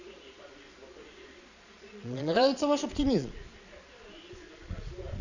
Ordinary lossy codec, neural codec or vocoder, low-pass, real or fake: none; vocoder, 22.05 kHz, 80 mel bands, Vocos; 7.2 kHz; fake